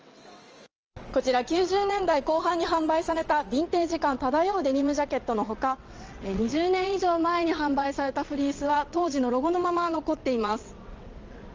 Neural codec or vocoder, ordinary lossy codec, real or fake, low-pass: vocoder, 22.05 kHz, 80 mel bands, WaveNeXt; Opus, 24 kbps; fake; 7.2 kHz